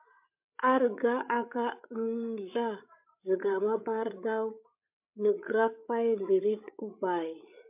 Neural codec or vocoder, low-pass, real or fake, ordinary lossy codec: codec, 16 kHz, 8 kbps, FreqCodec, larger model; 3.6 kHz; fake; MP3, 32 kbps